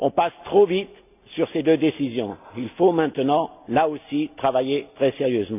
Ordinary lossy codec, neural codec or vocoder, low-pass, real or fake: none; none; 3.6 kHz; real